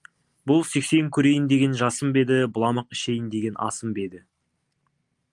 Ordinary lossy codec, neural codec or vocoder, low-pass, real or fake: Opus, 32 kbps; none; 10.8 kHz; real